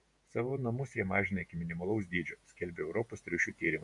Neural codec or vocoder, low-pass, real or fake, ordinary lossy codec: vocoder, 48 kHz, 128 mel bands, Vocos; 10.8 kHz; fake; AAC, 64 kbps